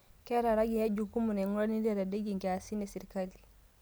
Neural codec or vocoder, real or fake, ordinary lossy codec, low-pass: none; real; none; none